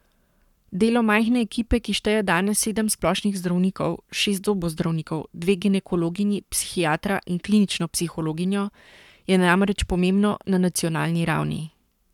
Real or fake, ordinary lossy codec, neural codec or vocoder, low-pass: fake; none; codec, 44.1 kHz, 7.8 kbps, Pupu-Codec; 19.8 kHz